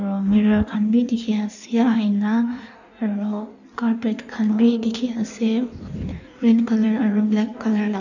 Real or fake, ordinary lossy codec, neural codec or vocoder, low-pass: fake; none; codec, 16 kHz in and 24 kHz out, 1.1 kbps, FireRedTTS-2 codec; 7.2 kHz